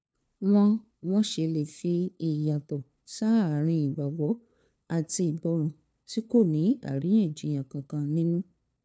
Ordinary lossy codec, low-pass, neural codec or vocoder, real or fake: none; none; codec, 16 kHz, 2 kbps, FunCodec, trained on LibriTTS, 25 frames a second; fake